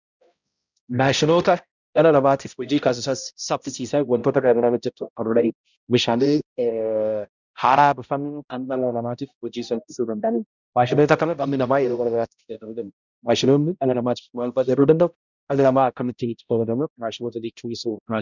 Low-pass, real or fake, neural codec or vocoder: 7.2 kHz; fake; codec, 16 kHz, 0.5 kbps, X-Codec, HuBERT features, trained on balanced general audio